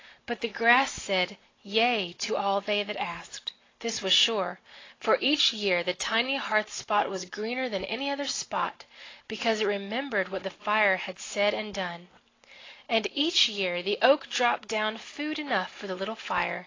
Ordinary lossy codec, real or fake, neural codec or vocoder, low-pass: AAC, 32 kbps; real; none; 7.2 kHz